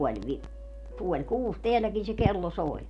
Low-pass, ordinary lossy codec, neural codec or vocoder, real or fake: 10.8 kHz; none; none; real